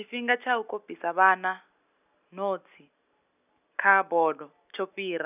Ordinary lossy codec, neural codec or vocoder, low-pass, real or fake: none; none; 3.6 kHz; real